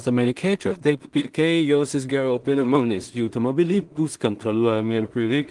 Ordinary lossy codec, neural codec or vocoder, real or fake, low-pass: Opus, 24 kbps; codec, 16 kHz in and 24 kHz out, 0.4 kbps, LongCat-Audio-Codec, two codebook decoder; fake; 10.8 kHz